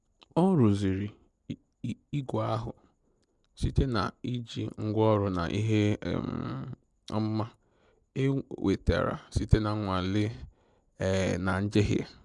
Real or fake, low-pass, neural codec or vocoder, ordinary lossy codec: real; 10.8 kHz; none; MP3, 96 kbps